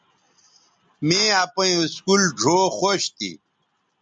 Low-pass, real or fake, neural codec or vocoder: 9.9 kHz; real; none